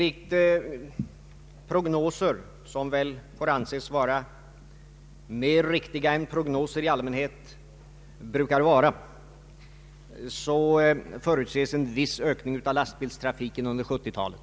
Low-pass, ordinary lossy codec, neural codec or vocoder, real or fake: none; none; none; real